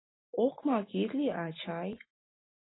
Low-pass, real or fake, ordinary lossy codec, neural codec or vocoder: 7.2 kHz; real; AAC, 16 kbps; none